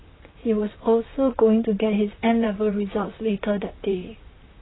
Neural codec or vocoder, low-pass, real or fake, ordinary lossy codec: vocoder, 44.1 kHz, 128 mel bands, Pupu-Vocoder; 7.2 kHz; fake; AAC, 16 kbps